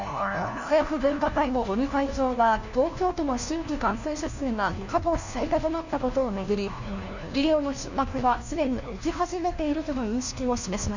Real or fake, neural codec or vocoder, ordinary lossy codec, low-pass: fake; codec, 16 kHz, 1 kbps, FunCodec, trained on LibriTTS, 50 frames a second; none; 7.2 kHz